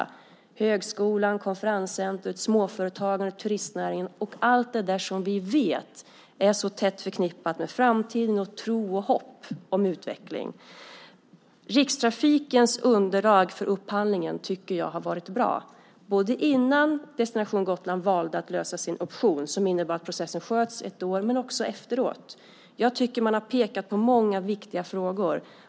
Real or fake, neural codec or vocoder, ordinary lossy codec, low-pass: real; none; none; none